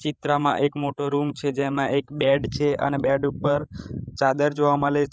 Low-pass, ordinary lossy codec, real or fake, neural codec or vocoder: none; none; fake; codec, 16 kHz, 8 kbps, FreqCodec, larger model